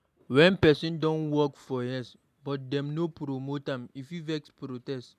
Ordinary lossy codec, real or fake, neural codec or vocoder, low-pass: none; real; none; 14.4 kHz